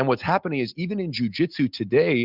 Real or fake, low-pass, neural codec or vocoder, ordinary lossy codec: real; 5.4 kHz; none; Opus, 64 kbps